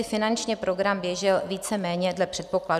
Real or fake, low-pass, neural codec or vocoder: real; 14.4 kHz; none